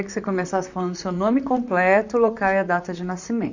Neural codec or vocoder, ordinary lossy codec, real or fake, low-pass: vocoder, 44.1 kHz, 128 mel bands, Pupu-Vocoder; none; fake; 7.2 kHz